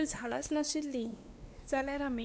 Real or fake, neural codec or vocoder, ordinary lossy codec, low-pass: fake; codec, 16 kHz, 2 kbps, X-Codec, WavLM features, trained on Multilingual LibriSpeech; none; none